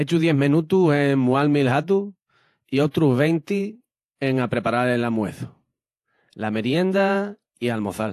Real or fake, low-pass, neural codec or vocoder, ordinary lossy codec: fake; 14.4 kHz; vocoder, 48 kHz, 128 mel bands, Vocos; AAC, 64 kbps